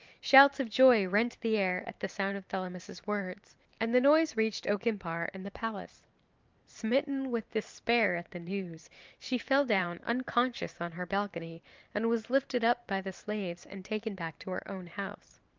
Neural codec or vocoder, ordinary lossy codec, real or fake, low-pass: vocoder, 44.1 kHz, 128 mel bands every 512 samples, BigVGAN v2; Opus, 24 kbps; fake; 7.2 kHz